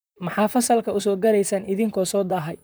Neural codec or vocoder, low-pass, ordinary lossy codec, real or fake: vocoder, 44.1 kHz, 128 mel bands, Pupu-Vocoder; none; none; fake